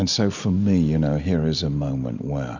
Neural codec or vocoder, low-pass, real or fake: none; 7.2 kHz; real